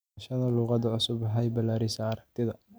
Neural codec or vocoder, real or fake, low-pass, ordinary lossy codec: none; real; none; none